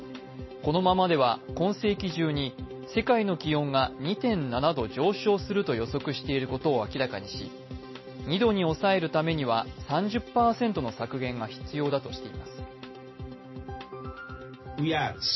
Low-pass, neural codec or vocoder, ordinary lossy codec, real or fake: 7.2 kHz; none; MP3, 24 kbps; real